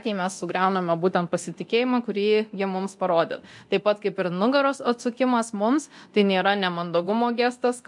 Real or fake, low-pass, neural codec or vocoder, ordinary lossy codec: fake; 10.8 kHz; codec, 24 kHz, 0.9 kbps, DualCodec; MP3, 64 kbps